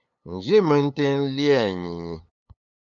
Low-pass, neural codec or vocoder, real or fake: 7.2 kHz; codec, 16 kHz, 8 kbps, FunCodec, trained on LibriTTS, 25 frames a second; fake